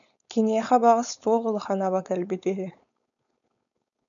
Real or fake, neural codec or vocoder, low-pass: fake; codec, 16 kHz, 4.8 kbps, FACodec; 7.2 kHz